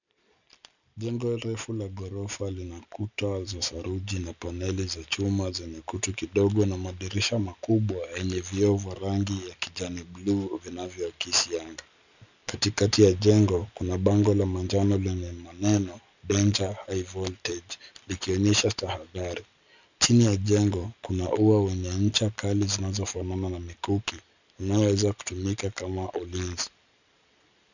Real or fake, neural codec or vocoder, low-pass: real; none; 7.2 kHz